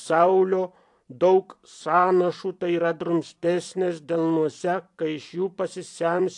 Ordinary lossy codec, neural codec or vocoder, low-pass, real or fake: AAC, 64 kbps; vocoder, 44.1 kHz, 128 mel bands every 256 samples, BigVGAN v2; 10.8 kHz; fake